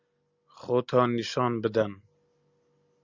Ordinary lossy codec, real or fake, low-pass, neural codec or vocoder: Opus, 64 kbps; real; 7.2 kHz; none